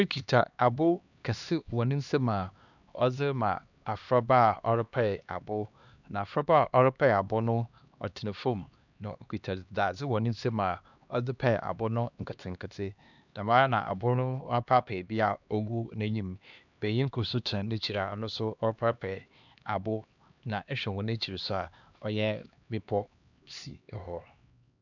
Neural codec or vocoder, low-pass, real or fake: codec, 16 kHz, 2 kbps, X-Codec, HuBERT features, trained on LibriSpeech; 7.2 kHz; fake